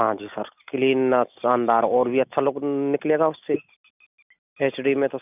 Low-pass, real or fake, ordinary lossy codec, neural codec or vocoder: 3.6 kHz; real; none; none